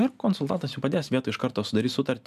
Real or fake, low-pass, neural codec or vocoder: real; 14.4 kHz; none